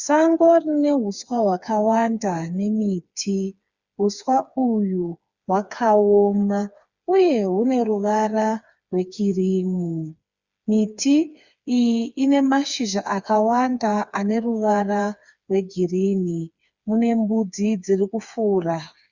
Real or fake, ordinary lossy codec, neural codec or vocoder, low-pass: fake; Opus, 64 kbps; codec, 16 kHz, 4 kbps, FreqCodec, smaller model; 7.2 kHz